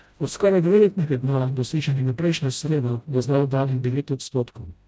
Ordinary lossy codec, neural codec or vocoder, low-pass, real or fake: none; codec, 16 kHz, 0.5 kbps, FreqCodec, smaller model; none; fake